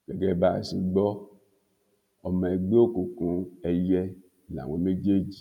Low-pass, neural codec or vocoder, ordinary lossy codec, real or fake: 19.8 kHz; none; none; real